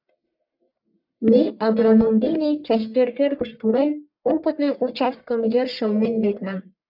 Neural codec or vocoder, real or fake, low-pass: codec, 44.1 kHz, 1.7 kbps, Pupu-Codec; fake; 5.4 kHz